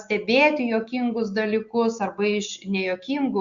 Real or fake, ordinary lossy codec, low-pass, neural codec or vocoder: real; Opus, 64 kbps; 7.2 kHz; none